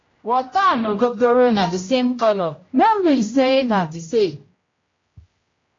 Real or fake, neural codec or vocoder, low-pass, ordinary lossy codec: fake; codec, 16 kHz, 0.5 kbps, X-Codec, HuBERT features, trained on general audio; 7.2 kHz; AAC, 32 kbps